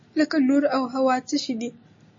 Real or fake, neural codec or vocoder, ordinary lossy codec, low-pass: real; none; MP3, 32 kbps; 7.2 kHz